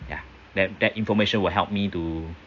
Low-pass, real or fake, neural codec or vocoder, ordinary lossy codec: 7.2 kHz; real; none; AAC, 48 kbps